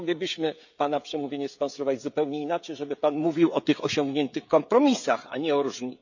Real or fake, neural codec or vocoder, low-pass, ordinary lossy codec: fake; codec, 16 kHz, 16 kbps, FreqCodec, smaller model; 7.2 kHz; none